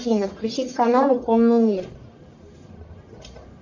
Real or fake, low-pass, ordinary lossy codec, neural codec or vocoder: fake; 7.2 kHz; AAC, 48 kbps; codec, 44.1 kHz, 1.7 kbps, Pupu-Codec